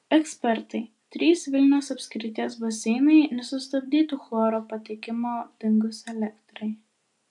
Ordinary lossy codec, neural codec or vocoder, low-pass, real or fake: AAC, 64 kbps; none; 10.8 kHz; real